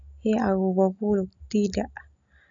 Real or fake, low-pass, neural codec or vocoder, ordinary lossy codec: real; 7.2 kHz; none; none